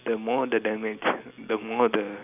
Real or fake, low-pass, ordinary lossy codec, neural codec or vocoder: real; 3.6 kHz; none; none